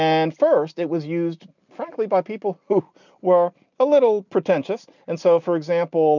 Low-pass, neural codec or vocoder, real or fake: 7.2 kHz; none; real